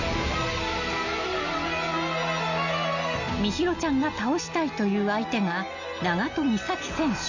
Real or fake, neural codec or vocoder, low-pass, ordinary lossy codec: real; none; 7.2 kHz; none